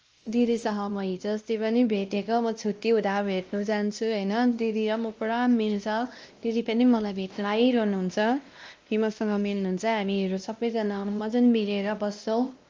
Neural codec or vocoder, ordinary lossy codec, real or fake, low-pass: codec, 16 kHz, 1 kbps, X-Codec, WavLM features, trained on Multilingual LibriSpeech; Opus, 24 kbps; fake; 7.2 kHz